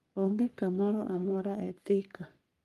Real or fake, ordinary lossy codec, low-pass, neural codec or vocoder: fake; Opus, 32 kbps; 14.4 kHz; codec, 44.1 kHz, 3.4 kbps, Pupu-Codec